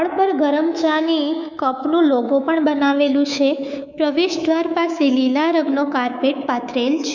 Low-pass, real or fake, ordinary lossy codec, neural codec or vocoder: 7.2 kHz; fake; none; codec, 24 kHz, 3.1 kbps, DualCodec